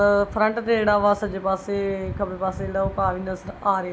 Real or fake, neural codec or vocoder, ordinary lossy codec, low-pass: real; none; none; none